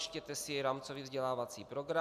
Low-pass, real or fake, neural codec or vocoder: 14.4 kHz; real; none